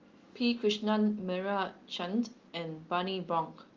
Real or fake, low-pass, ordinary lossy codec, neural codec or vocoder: real; 7.2 kHz; Opus, 32 kbps; none